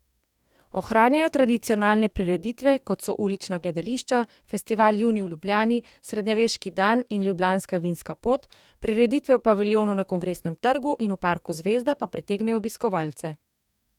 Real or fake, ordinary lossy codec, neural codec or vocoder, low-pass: fake; none; codec, 44.1 kHz, 2.6 kbps, DAC; 19.8 kHz